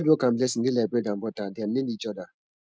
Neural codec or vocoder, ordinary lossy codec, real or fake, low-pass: none; none; real; none